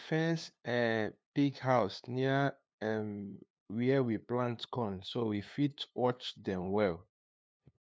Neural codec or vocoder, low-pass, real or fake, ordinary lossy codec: codec, 16 kHz, 2 kbps, FunCodec, trained on LibriTTS, 25 frames a second; none; fake; none